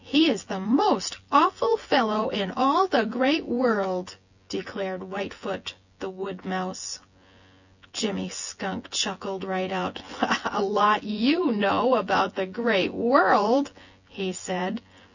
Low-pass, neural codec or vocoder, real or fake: 7.2 kHz; vocoder, 24 kHz, 100 mel bands, Vocos; fake